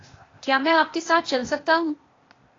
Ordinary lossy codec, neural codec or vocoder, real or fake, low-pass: AAC, 32 kbps; codec, 16 kHz, 0.8 kbps, ZipCodec; fake; 7.2 kHz